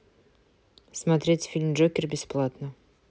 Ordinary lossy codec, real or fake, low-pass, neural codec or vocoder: none; real; none; none